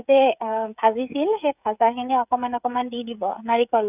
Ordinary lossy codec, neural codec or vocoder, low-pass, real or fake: none; codec, 16 kHz, 16 kbps, FreqCodec, smaller model; 3.6 kHz; fake